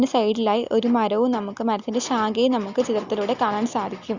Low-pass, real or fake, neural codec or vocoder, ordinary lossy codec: 7.2 kHz; real; none; Opus, 64 kbps